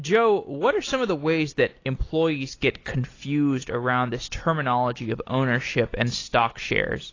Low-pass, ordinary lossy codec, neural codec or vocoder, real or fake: 7.2 kHz; AAC, 32 kbps; none; real